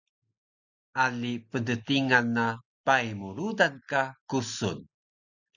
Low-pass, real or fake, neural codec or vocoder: 7.2 kHz; real; none